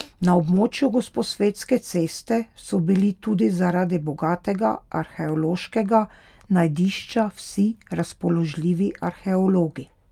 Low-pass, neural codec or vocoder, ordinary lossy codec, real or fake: 19.8 kHz; none; Opus, 32 kbps; real